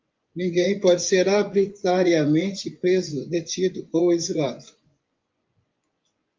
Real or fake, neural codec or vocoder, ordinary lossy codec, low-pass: fake; vocoder, 24 kHz, 100 mel bands, Vocos; Opus, 24 kbps; 7.2 kHz